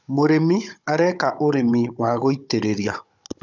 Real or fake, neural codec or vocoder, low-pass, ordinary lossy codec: fake; codec, 16 kHz, 16 kbps, FunCodec, trained on Chinese and English, 50 frames a second; 7.2 kHz; none